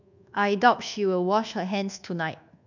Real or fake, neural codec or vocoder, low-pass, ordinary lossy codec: fake; codec, 24 kHz, 1.2 kbps, DualCodec; 7.2 kHz; none